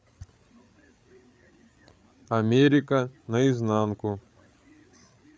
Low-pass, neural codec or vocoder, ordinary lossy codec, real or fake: none; codec, 16 kHz, 8 kbps, FreqCodec, larger model; none; fake